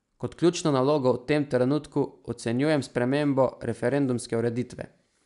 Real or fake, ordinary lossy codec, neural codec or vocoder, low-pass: real; none; none; 10.8 kHz